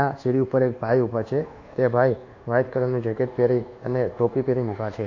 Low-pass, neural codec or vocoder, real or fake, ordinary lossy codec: 7.2 kHz; codec, 24 kHz, 1.2 kbps, DualCodec; fake; AAC, 48 kbps